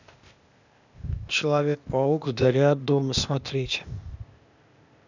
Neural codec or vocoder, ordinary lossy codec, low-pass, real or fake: codec, 16 kHz, 0.8 kbps, ZipCodec; none; 7.2 kHz; fake